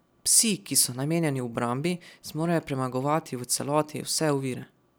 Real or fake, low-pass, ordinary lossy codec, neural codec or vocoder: real; none; none; none